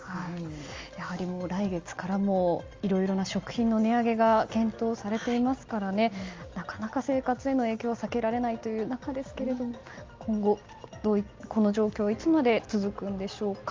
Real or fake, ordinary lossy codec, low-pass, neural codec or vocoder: real; Opus, 32 kbps; 7.2 kHz; none